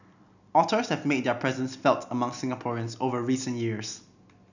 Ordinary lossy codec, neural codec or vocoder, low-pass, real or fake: none; none; 7.2 kHz; real